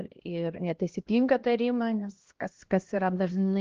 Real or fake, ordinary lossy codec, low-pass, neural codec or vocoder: fake; Opus, 16 kbps; 7.2 kHz; codec, 16 kHz, 1 kbps, X-Codec, HuBERT features, trained on LibriSpeech